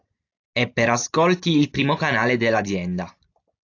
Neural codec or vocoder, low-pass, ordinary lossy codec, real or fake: none; 7.2 kHz; AAC, 48 kbps; real